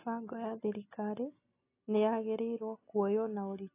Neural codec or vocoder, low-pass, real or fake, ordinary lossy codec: none; 3.6 kHz; real; AAC, 24 kbps